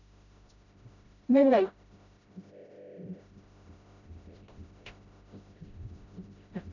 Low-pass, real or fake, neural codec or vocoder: 7.2 kHz; fake; codec, 16 kHz, 0.5 kbps, FreqCodec, smaller model